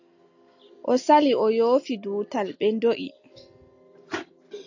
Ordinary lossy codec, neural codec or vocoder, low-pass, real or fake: AAC, 48 kbps; none; 7.2 kHz; real